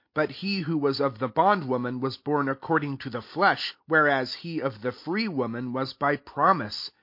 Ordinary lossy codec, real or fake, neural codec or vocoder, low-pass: MP3, 32 kbps; real; none; 5.4 kHz